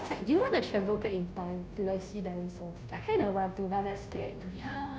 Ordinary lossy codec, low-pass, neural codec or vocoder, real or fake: none; none; codec, 16 kHz, 0.5 kbps, FunCodec, trained on Chinese and English, 25 frames a second; fake